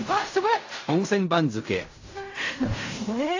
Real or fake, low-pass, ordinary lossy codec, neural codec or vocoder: fake; 7.2 kHz; AAC, 48 kbps; codec, 16 kHz in and 24 kHz out, 0.4 kbps, LongCat-Audio-Codec, fine tuned four codebook decoder